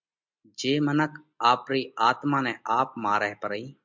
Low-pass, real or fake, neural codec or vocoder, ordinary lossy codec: 7.2 kHz; real; none; MP3, 64 kbps